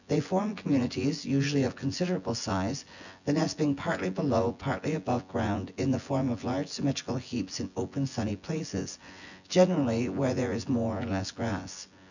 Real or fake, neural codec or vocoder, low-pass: fake; vocoder, 24 kHz, 100 mel bands, Vocos; 7.2 kHz